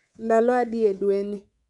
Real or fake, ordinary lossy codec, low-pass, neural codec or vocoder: fake; MP3, 96 kbps; 10.8 kHz; codec, 24 kHz, 3.1 kbps, DualCodec